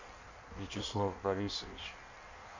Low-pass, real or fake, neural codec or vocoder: 7.2 kHz; fake; codec, 16 kHz in and 24 kHz out, 1.1 kbps, FireRedTTS-2 codec